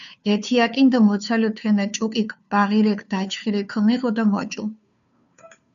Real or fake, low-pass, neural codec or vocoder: fake; 7.2 kHz; codec, 16 kHz, 2 kbps, FunCodec, trained on Chinese and English, 25 frames a second